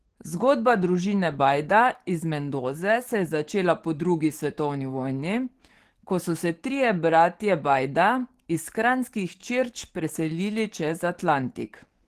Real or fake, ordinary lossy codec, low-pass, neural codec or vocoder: fake; Opus, 16 kbps; 14.4 kHz; codec, 44.1 kHz, 7.8 kbps, DAC